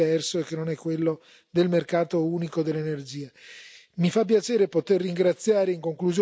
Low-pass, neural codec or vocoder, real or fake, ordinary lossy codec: none; none; real; none